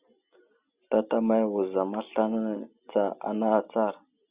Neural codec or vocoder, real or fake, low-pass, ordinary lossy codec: none; real; 3.6 kHz; Opus, 64 kbps